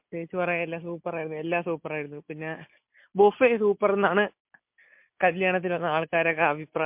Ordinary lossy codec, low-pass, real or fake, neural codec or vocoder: MP3, 32 kbps; 3.6 kHz; real; none